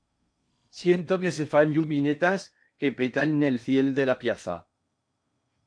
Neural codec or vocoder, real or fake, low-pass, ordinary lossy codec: codec, 16 kHz in and 24 kHz out, 0.8 kbps, FocalCodec, streaming, 65536 codes; fake; 9.9 kHz; MP3, 64 kbps